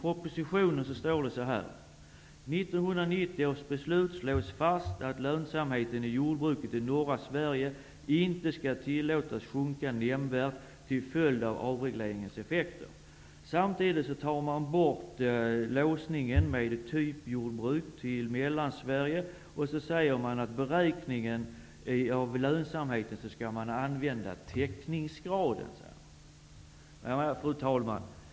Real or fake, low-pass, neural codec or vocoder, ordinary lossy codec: real; none; none; none